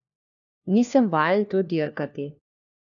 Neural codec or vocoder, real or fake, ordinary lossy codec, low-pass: codec, 16 kHz, 1 kbps, FunCodec, trained on LibriTTS, 50 frames a second; fake; none; 7.2 kHz